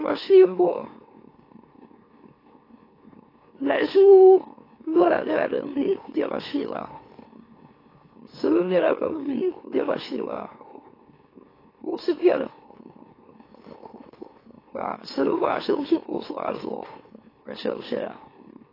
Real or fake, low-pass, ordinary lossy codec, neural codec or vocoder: fake; 5.4 kHz; AAC, 24 kbps; autoencoder, 44.1 kHz, a latent of 192 numbers a frame, MeloTTS